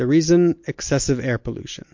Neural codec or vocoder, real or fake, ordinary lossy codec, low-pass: none; real; MP3, 48 kbps; 7.2 kHz